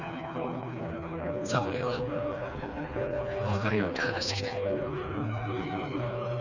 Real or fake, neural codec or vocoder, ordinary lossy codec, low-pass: fake; codec, 16 kHz, 2 kbps, FreqCodec, smaller model; none; 7.2 kHz